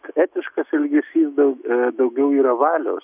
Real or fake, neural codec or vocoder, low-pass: fake; autoencoder, 48 kHz, 128 numbers a frame, DAC-VAE, trained on Japanese speech; 3.6 kHz